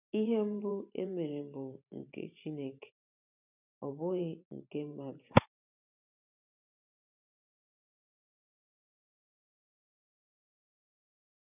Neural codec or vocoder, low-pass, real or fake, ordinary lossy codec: vocoder, 44.1 kHz, 128 mel bands every 512 samples, BigVGAN v2; 3.6 kHz; fake; none